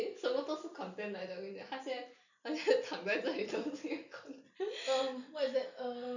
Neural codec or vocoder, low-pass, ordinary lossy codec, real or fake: none; 7.2 kHz; none; real